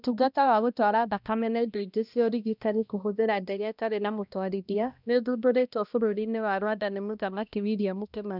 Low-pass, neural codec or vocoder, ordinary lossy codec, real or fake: 5.4 kHz; codec, 16 kHz, 1 kbps, X-Codec, HuBERT features, trained on balanced general audio; none; fake